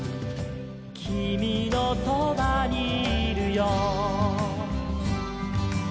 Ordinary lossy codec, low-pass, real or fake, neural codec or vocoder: none; none; real; none